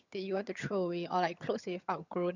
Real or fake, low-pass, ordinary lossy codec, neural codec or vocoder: fake; 7.2 kHz; none; vocoder, 22.05 kHz, 80 mel bands, HiFi-GAN